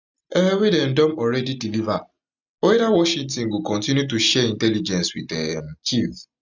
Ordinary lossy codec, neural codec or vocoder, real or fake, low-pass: none; none; real; 7.2 kHz